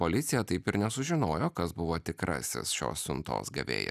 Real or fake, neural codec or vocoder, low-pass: real; none; 14.4 kHz